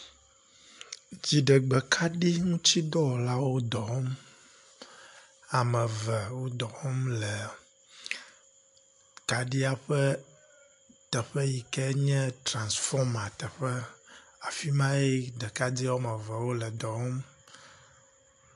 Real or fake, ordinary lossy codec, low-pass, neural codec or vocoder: real; AAC, 64 kbps; 10.8 kHz; none